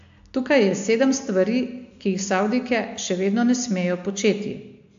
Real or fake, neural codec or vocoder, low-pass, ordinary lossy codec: real; none; 7.2 kHz; AAC, 64 kbps